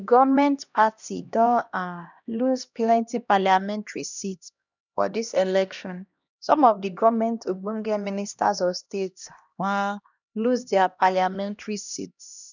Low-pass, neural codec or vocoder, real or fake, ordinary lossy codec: 7.2 kHz; codec, 16 kHz, 1 kbps, X-Codec, HuBERT features, trained on LibriSpeech; fake; none